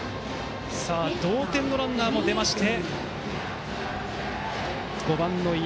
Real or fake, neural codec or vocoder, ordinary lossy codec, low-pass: real; none; none; none